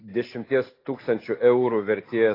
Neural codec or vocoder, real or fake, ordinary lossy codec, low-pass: none; real; AAC, 24 kbps; 5.4 kHz